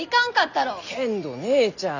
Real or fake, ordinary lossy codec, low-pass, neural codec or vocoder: real; none; 7.2 kHz; none